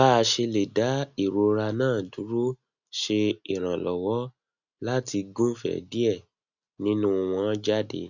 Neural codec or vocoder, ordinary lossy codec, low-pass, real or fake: none; none; 7.2 kHz; real